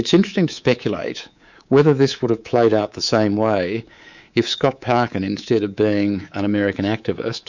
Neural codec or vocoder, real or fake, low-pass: codec, 24 kHz, 3.1 kbps, DualCodec; fake; 7.2 kHz